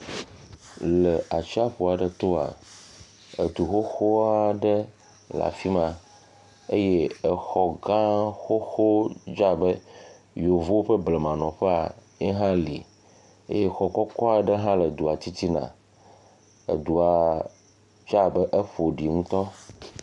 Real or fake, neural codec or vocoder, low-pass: real; none; 10.8 kHz